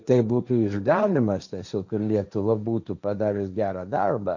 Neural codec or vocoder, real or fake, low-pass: codec, 16 kHz, 1.1 kbps, Voila-Tokenizer; fake; 7.2 kHz